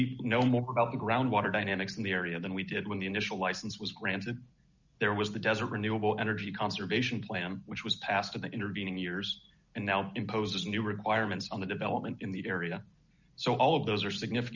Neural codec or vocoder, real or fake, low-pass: none; real; 7.2 kHz